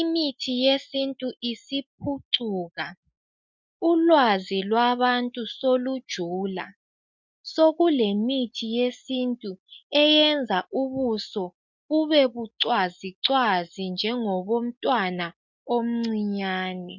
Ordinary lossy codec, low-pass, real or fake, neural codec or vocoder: MP3, 64 kbps; 7.2 kHz; real; none